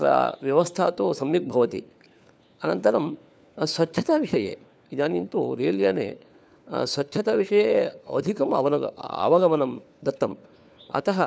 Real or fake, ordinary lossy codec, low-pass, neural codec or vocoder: fake; none; none; codec, 16 kHz, 4 kbps, FunCodec, trained on LibriTTS, 50 frames a second